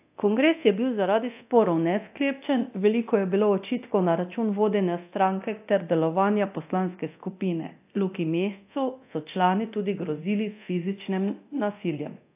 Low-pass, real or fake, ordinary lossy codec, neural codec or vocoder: 3.6 kHz; fake; AAC, 32 kbps; codec, 24 kHz, 0.9 kbps, DualCodec